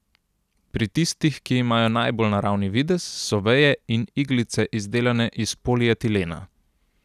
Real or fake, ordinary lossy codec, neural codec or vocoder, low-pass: real; none; none; 14.4 kHz